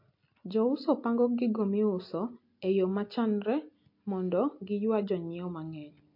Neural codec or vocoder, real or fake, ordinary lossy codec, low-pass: none; real; MP3, 32 kbps; 5.4 kHz